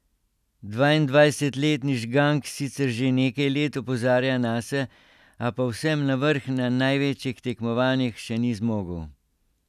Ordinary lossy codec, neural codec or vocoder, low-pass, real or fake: none; none; 14.4 kHz; real